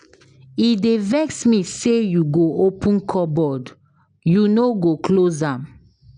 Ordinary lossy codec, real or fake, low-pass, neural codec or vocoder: none; real; 9.9 kHz; none